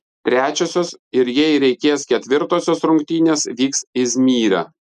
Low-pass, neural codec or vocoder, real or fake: 14.4 kHz; none; real